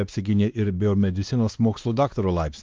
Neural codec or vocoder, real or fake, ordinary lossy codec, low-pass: codec, 16 kHz, 2 kbps, X-Codec, WavLM features, trained on Multilingual LibriSpeech; fake; Opus, 32 kbps; 7.2 kHz